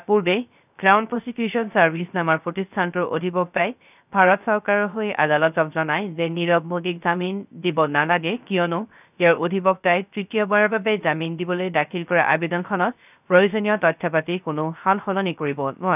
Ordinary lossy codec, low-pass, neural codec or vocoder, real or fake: none; 3.6 kHz; codec, 16 kHz, 0.3 kbps, FocalCodec; fake